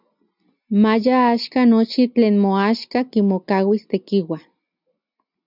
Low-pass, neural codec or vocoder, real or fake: 5.4 kHz; none; real